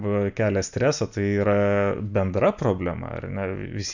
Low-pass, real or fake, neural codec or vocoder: 7.2 kHz; real; none